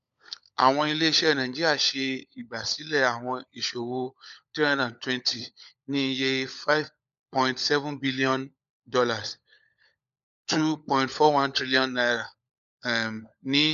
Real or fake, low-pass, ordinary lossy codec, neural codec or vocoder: fake; 7.2 kHz; none; codec, 16 kHz, 16 kbps, FunCodec, trained on LibriTTS, 50 frames a second